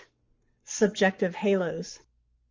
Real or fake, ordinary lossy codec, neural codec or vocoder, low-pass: fake; Opus, 32 kbps; codec, 44.1 kHz, 7.8 kbps, Pupu-Codec; 7.2 kHz